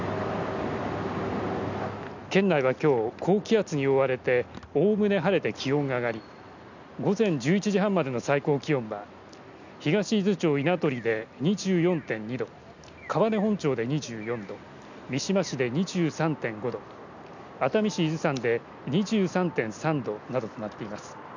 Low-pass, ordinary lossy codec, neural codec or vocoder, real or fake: 7.2 kHz; none; none; real